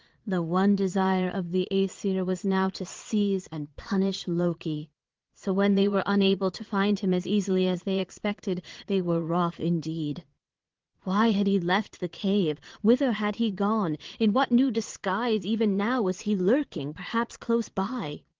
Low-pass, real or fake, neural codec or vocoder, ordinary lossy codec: 7.2 kHz; fake; vocoder, 22.05 kHz, 80 mel bands, Vocos; Opus, 16 kbps